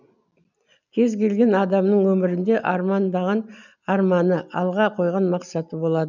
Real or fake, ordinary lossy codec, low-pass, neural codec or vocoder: real; none; 7.2 kHz; none